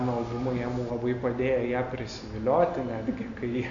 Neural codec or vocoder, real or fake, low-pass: none; real; 7.2 kHz